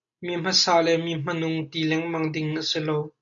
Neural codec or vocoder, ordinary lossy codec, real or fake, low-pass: none; AAC, 64 kbps; real; 7.2 kHz